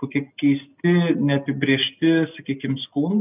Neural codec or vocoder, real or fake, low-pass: none; real; 3.6 kHz